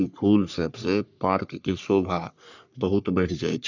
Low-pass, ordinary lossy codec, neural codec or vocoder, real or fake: 7.2 kHz; none; codec, 44.1 kHz, 3.4 kbps, Pupu-Codec; fake